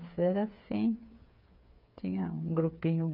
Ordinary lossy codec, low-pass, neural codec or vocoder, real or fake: none; 5.4 kHz; codec, 16 kHz, 8 kbps, FreqCodec, smaller model; fake